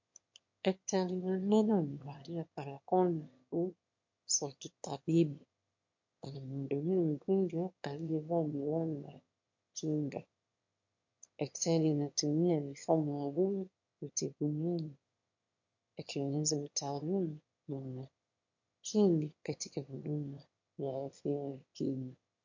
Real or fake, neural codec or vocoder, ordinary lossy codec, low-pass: fake; autoencoder, 22.05 kHz, a latent of 192 numbers a frame, VITS, trained on one speaker; MP3, 48 kbps; 7.2 kHz